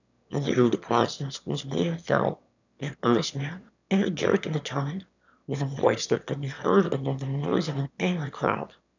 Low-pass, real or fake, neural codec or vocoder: 7.2 kHz; fake; autoencoder, 22.05 kHz, a latent of 192 numbers a frame, VITS, trained on one speaker